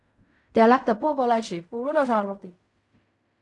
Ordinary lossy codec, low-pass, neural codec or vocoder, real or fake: AAC, 48 kbps; 10.8 kHz; codec, 16 kHz in and 24 kHz out, 0.4 kbps, LongCat-Audio-Codec, fine tuned four codebook decoder; fake